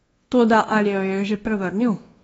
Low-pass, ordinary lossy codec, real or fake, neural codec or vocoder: 10.8 kHz; AAC, 24 kbps; fake; codec, 24 kHz, 1.2 kbps, DualCodec